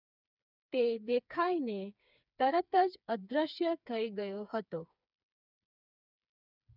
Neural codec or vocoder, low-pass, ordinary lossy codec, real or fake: codec, 16 kHz, 4 kbps, FreqCodec, smaller model; 5.4 kHz; none; fake